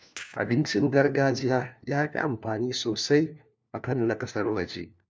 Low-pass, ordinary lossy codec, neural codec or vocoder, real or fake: none; none; codec, 16 kHz, 1 kbps, FunCodec, trained on LibriTTS, 50 frames a second; fake